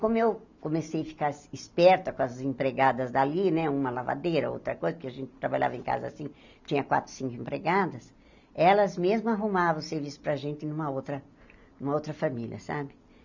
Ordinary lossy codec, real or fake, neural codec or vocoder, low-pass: none; real; none; 7.2 kHz